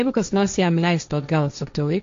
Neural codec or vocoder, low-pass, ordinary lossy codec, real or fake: codec, 16 kHz, 1.1 kbps, Voila-Tokenizer; 7.2 kHz; MP3, 48 kbps; fake